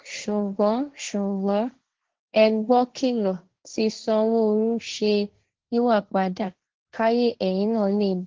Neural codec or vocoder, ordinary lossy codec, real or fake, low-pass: codec, 16 kHz, 1.1 kbps, Voila-Tokenizer; Opus, 16 kbps; fake; 7.2 kHz